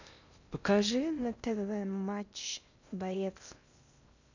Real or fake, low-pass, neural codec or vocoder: fake; 7.2 kHz; codec, 16 kHz in and 24 kHz out, 0.6 kbps, FocalCodec, streaming, 2048 codes